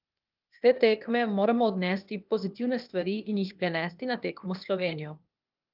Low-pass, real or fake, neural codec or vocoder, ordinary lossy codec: 5.4 kHz; fake; codec, 16 kHz, 0.8 kbps, ZipCodec; Opus, 24 kbps